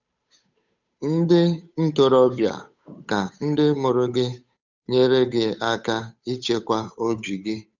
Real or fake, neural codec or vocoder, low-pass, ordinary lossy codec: fake; codec, 16 kHz, 8 kbps, FunCodec, trained on Chinese and English, 25 frames a second; 7.2 kHz; none